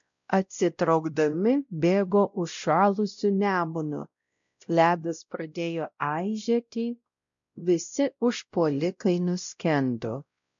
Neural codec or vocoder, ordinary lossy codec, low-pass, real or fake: codec, 16 kHz, 0.5 kbps, X-Codec, WavLM features, trained on Multilingual LibriSpeech; MP3, 64 kbps; 7.2 kHz; fake